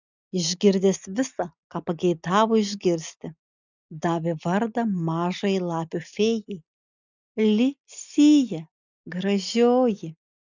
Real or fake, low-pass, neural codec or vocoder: real; 7.2 kHz; none